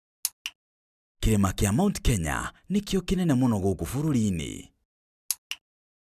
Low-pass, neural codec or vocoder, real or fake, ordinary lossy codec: 14.4 kHz; none; real; none